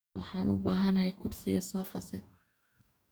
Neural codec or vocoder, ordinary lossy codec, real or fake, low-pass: codec, 44.1 kHz, 2.6 kbps, DAC; none; fake; none